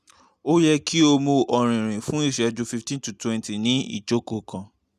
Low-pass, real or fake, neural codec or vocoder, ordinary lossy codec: 14.4 kHz; real; none; none